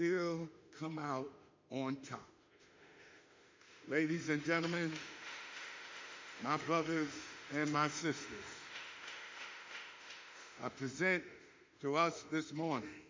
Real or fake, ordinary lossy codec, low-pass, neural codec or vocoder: fake; MP3, 64 kbps; 7.2 kHz; autoencoder, 48 kHz, 32 numbers a frame, DAC-VAE, trained on Japanese speech